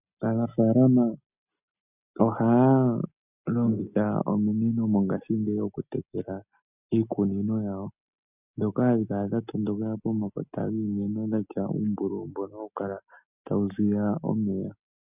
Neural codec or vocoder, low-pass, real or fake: none; 3.6 kHz; real